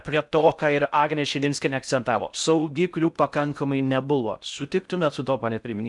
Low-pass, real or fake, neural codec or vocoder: 10.8 kHz; fake; codec, 16 kHz in and 24 kHz out, 0.6 kbps, FocalCodec, streaming, 4096 codes